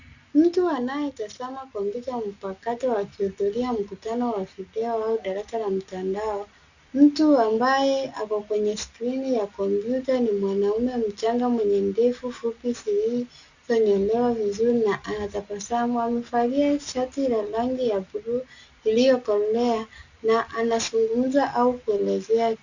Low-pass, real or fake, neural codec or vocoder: 7.2 kHz; real; none